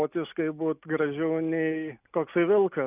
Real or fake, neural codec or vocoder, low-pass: real; none; 3.6 kHz